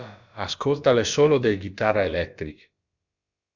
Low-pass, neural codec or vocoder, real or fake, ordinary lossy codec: 7.2 kHz; codec, 16 kHz, about 1 kbps, DyCAST, with the encoder's durations; fake; Opus, 64 kbps